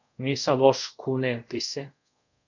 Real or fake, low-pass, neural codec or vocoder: fake; 7.2 kHz; codec, 24 kHz, 0.5 kbps, DualCodec